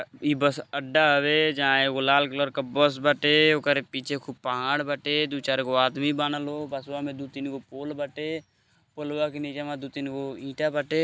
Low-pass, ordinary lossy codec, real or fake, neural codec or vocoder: none; none; real; none